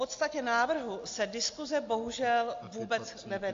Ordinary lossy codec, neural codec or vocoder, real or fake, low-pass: AAC, 48 kbps; none; real; 7.2 kHz